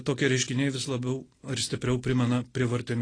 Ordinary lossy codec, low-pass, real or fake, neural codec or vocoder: AAC, 32 kbps; 9.9 kHz; real; none